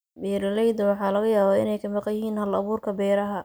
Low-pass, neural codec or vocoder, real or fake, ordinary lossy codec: none; none; real; none